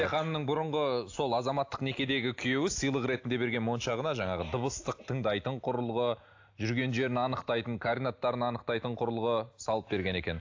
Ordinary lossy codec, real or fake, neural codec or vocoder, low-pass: AAC, 48 kbps; real; none; 7.2 kHz